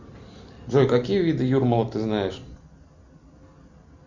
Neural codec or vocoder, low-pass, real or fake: none; 7.2 kHz; real